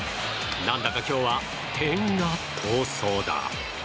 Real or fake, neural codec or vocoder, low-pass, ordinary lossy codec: real; none; none; none